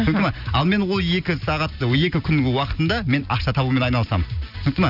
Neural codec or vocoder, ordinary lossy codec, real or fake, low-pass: none; none; real; 5.4 kHz